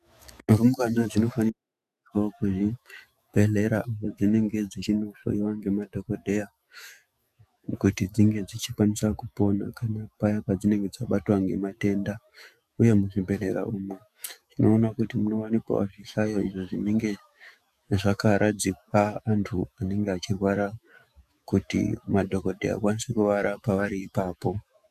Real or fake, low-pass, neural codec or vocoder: fake; 14.4 kHz; autoencoder, 48 kHz, 128 numbers a frame, DAC-VAE, trained on Japanese speech